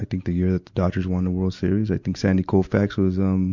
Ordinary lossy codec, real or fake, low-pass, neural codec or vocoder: Opus, 64 kbps; real; 7.2 kHz; none